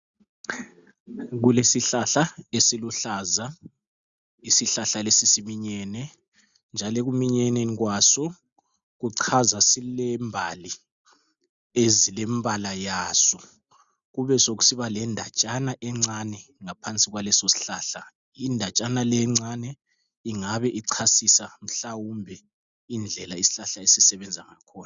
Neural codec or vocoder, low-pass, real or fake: none; 7.2 kHz; real